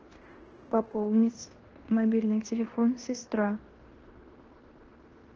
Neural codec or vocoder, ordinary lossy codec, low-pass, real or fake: codec, 16 kHz in and 24 kHz out, 0.9 kbps, LongCat-Audio-Codec, fine tuned four codebook decoder; Opus, 16 kbps; 7.2 kHz; fake